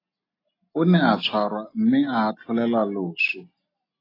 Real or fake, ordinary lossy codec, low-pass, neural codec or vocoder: real; AAC, 24 kbps; 5.4 kHz; none